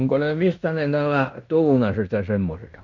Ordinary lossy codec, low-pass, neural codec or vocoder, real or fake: none; 7.2 kHz; codec, 16 kHz in and 24 kHz out, 0.9 kbps, LongCat-Audio-Codec, fine tuned four codebook decoder; fake